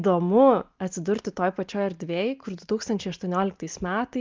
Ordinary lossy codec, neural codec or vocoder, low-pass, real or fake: Opus, 32 kbps; none; 7.2 kHz; real